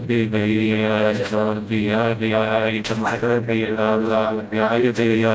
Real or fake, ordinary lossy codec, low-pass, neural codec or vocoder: fake; none; none; codec, 16 kHz, 0.5 kbps, FreqCodec, smaller model